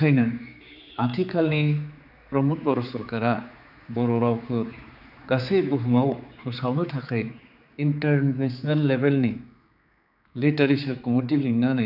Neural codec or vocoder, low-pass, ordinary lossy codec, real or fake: codec, 16 kHz, 4 kbps, X-Codec, HuBERT features, trained on general audio; 5.4 kHz; none; fake